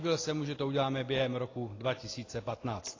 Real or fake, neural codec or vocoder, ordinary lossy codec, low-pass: real; none; AAC, 32 kbps; 7.2 kHz